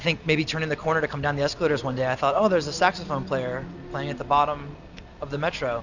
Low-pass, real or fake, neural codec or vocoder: 7.2 kHz; real; none